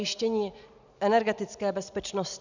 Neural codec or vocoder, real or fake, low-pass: none; real; 7.2 kHz